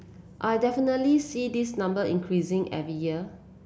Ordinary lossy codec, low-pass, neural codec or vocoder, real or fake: none; none; none; real